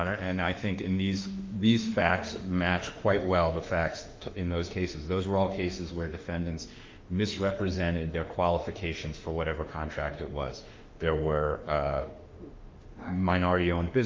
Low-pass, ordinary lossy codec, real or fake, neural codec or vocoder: 7.2 kHz; Opus, 24 kbps; fake; autoencoder, 48 kHz, 32 numbers a frame, DAC-VAE, trained on Japanese speech